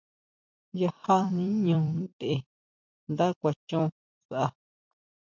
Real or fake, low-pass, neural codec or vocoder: fake; 7.2 kHz; vocoder, 44.1 kHz, 128 mel bands every 256 samples, BigVGAN v2